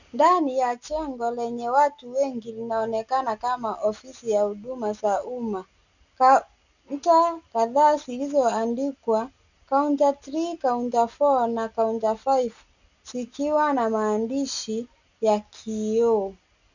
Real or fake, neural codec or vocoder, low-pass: real; none; 7.2 kHz